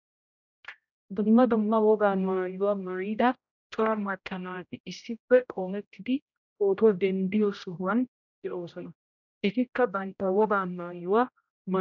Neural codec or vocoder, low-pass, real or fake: codec, 16 kHz, 0.5 kbps, X-Codec, HuBERT features, trained on general audio; 7.2 kHz; fake